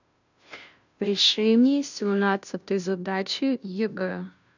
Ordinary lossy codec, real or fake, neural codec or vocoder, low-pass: none; fake; codec, 16 kHz, 0.5 kbps, FunCodec, trained on Chinese and English, 25 frames a second; 7.2 kHz